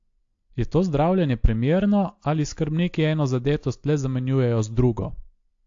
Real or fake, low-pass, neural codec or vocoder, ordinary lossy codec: real; 7.2 kHz; none; AAC, 48 kbps